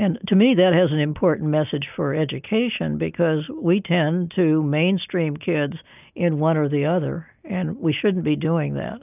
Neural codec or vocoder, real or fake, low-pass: none; real; 3.6 kHz